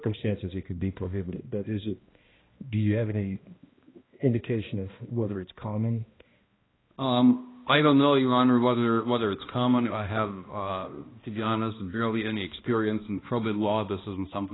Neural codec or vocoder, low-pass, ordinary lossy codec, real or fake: codec, 16 kHz, 1 kbps, X-Codec, HuBERT features, trained on balanced general audio; 7.2 kHz; AAC, 16 kbps; fake